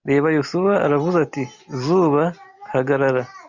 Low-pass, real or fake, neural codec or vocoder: 7.2 kHz; real; none